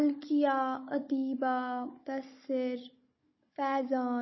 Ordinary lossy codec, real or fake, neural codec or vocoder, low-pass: MP3, 24 kbps; fake; codec, 16 kHz, 16 kbps, FunCodec, trained on Chinese and English, 50 frames a second; 7.2 kHz